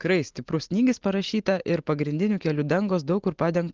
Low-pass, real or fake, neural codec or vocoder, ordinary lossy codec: 7.2 kHz; real; none; Opus, 16 kbps